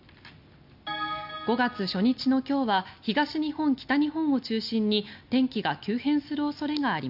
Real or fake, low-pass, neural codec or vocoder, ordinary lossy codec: real; 5.4 kHz; none; none